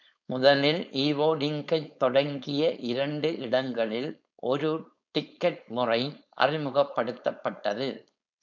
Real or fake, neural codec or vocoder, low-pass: fake; codec, 16 kHz, 4.8 kbps, FACodec; 7.2 kHz